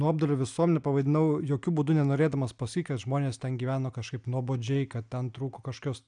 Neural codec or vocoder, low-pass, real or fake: none; 9.9 kHz; real